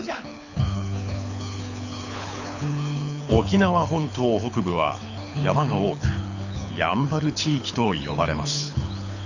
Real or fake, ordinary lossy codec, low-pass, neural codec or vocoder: fake; none; 7.2 kHz; codec, 24 kHz, 6 kbps, HILCodec